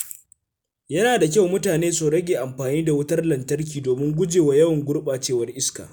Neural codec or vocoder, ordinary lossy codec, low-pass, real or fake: none; none; none; real